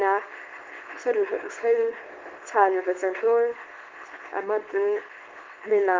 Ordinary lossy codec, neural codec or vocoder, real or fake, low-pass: Opus, 16 kbps; codec, 24 kHz, 0.9 kbps, WavTokenizer, small release; fake; 7.2 kHz